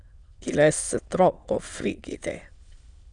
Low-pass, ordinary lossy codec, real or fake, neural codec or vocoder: 9.9 kHz; Opus, 64 kbps; fake; autoencoder, 22.05 kHz, a latent of 192 numbers a frame, VITS, trained on many speakers